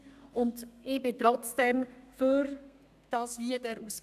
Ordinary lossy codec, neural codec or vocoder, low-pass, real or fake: none; codec, 32 kHz, 1.9 kbps, SNAC; 14.4 kHz; fake